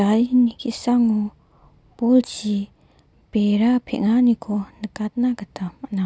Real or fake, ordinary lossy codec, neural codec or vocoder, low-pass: real; none; none; none